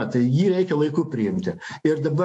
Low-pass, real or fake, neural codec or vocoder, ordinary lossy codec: 10.8 kHz; fake; autoencoder, 48 kHz, 128 numbers a frame, DAC-VAE, trained on Japanese speech; AAC, 64 kbps